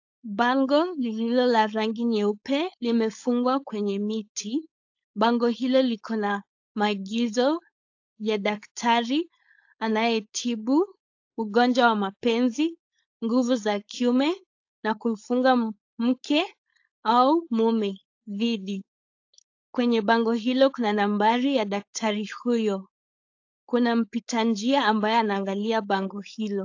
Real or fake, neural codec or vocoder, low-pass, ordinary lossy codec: fake; codec, 16 kHz, 4.8 kbps, FACodec; 7.2 kHz; AAC, 48 kbps